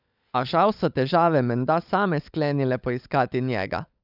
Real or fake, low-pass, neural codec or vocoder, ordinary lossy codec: fake; 5.4 kHz; codec, 16 kHz, 16 kbps, FunCodec, trained on LibriTTS, 50 frames a second; none